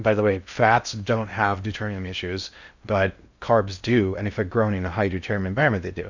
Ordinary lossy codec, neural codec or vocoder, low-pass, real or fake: Opus, 64 kbps; codec, 16 kHz in and 24 kHz out, 0.6 kbps, FocalCodec, streaming, 2048 codes; 7.2 kHz; fake